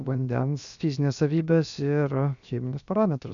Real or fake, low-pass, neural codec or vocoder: fake; 7.2 kHz; codec, 16 kHz, 0.7 kbps, FocalCodec